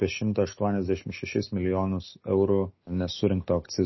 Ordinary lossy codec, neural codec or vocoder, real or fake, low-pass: MP3, 24 kbps; none; real; 7.2 kHz